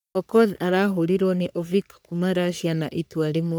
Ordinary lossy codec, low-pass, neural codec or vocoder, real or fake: none; none; codec, 44.1 kHz, 3.4 kbps, Pupu-Codec; fake